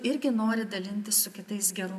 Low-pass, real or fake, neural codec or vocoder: 14.4 kHz; real; none